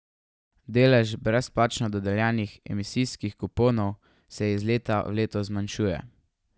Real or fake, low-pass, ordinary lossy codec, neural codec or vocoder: real; none; none; none